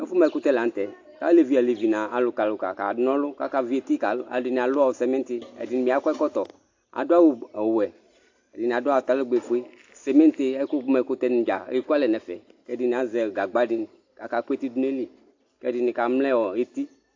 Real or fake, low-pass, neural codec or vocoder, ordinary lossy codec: real; 7.2 kHz; none; AAC, 48 kbps